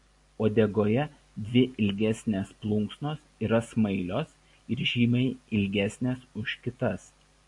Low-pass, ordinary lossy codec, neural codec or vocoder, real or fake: 10.8 kHz; AAC, 64 kbps; none; real